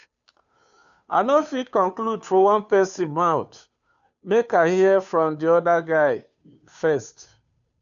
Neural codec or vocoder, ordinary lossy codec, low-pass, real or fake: codec, 16 kHz, 2 kbps, FunCodec, trained on Chinese and English, 25 frames a second; none; 7.2 kHz; fake